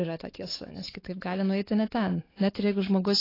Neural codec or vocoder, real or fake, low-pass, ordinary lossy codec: codec, 16 kHz, 4 kbps, X-Codec, WavLM features, trained on Multilingual LibriSpeech; fake; 5.4 kHz; AAC, 24 kbps